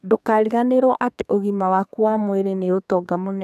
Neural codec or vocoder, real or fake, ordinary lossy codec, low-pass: codec, 32 kHz, 1.9 kbps, SNAC; fake; none; 14.4 kHz